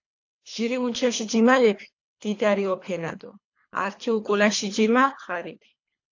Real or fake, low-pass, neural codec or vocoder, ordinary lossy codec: fake; 7.2 kHz; codec, 24 kHz, 3 kbps, HILCodec; AAC, 48 kbps